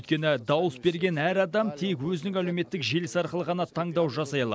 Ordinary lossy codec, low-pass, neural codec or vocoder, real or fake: none; none; none; real